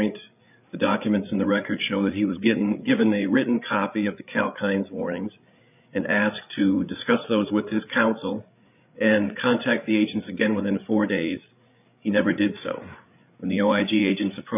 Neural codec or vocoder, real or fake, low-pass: codec, 16 kHz, 8 kbps, FreqCodec, larger model; fake; 3.6 kHz